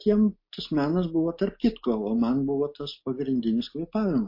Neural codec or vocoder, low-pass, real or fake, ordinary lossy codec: none; 5.4 kHz; real; MP3, 32 kbps